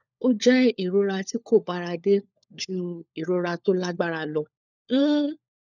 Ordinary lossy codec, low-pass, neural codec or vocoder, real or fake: none; 7.2 kHz; codec, 16 kHz, 4 kbps, FunCodec, trained on LibriTTS, 50 frames a second; fake